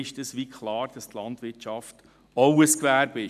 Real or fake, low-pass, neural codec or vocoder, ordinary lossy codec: real; 14.4 kHz; none; none